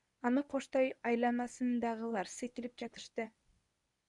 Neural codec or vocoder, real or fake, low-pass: codec, 24 kHz, 0.9 kbps, WavTokenizer, medium speech release version 1; fake; 10.8 kHz